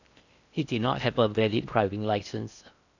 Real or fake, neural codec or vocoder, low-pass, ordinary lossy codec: fake; codec, 16 kHz in and 24 kHz out, 0.6 kbps, FocalCodec, streaming, 4096 codes; 7.2 kHz; none